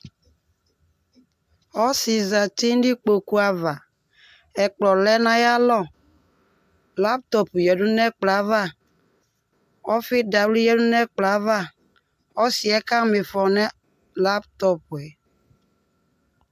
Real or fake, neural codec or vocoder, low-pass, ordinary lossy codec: real; none; 14.4 kHz; AAC, 96 kbps